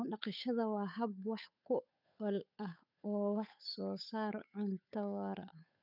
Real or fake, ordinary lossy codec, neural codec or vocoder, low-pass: fake; none; codec, 16 kHz, 8 kbps, FunCodec, trained on Chinese and English, 25 frames a second; 5.4 kHz